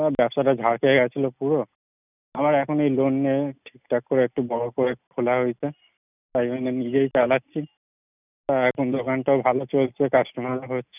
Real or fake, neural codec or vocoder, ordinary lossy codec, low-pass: real; none; none; 3.6 kHz